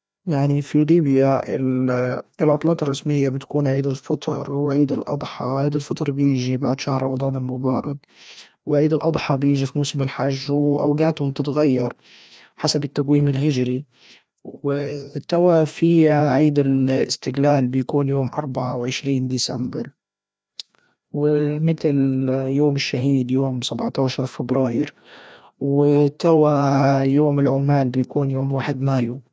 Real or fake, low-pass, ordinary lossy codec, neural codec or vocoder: fake; none; none; codec, 16 kHz, 1 kbps, FreqCodec, larger model